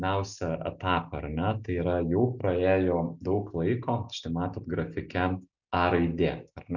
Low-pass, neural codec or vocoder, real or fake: 7.2 kHz; none; real